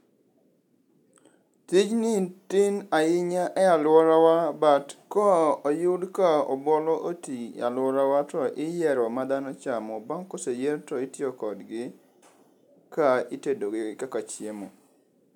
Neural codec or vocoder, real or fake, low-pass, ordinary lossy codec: none; real; 19.8 kHz; none